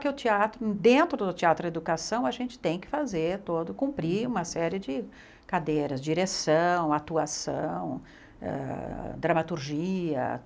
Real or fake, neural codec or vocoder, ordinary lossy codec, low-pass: real; none; none; none